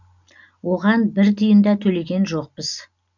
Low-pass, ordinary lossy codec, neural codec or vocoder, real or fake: 7.2 kHz; none; none; real